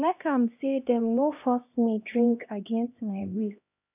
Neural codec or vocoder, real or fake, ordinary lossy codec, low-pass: codec, 16 kHz, 1 kbps, X-Codec, HuBERT features, trained on LibriSpeech; fake; none; 3.6 kHz